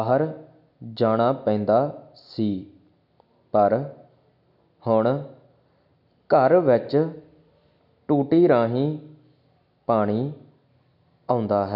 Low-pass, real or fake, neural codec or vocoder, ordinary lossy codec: 5.4 kHz; real; none; none